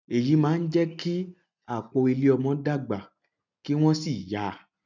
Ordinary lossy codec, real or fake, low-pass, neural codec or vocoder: none; real; 7.2 kHz; none